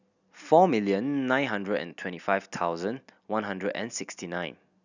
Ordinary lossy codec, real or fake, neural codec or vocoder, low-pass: none; real; none; 7.2 kHz